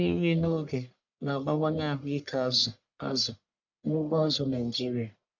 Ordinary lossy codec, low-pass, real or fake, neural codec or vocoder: MP3, 64 kbps; 7.2 kHz; fake; codec, 44.1 kHz, 1.7 kbps, Pupu-Codec